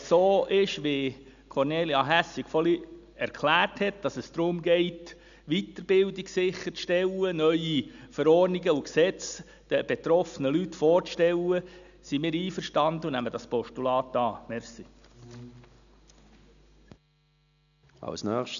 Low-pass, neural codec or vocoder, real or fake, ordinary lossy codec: 7.2 kHz; none; real; none